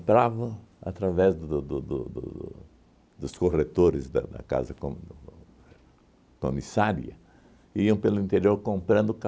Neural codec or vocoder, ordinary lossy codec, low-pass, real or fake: none; none; none; real